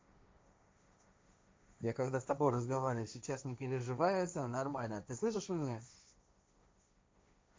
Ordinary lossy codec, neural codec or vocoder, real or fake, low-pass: none; codec, 16 kHz, 1.1 kbps, Voila-Tokenizer; fake; none